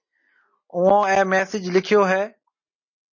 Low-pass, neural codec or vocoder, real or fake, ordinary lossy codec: 7.2 kHz; none; real; MP3, 32 kbps